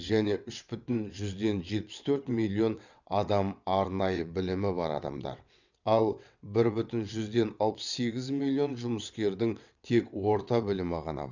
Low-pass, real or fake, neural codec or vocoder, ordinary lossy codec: 7.2 kHz; fake; vocoder, 22.05 kHz, 80 mel bands, WaveNeXt; none